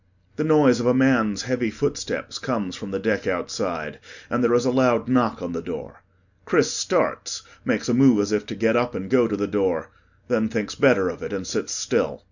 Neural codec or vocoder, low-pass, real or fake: none; 7.2 kHz; real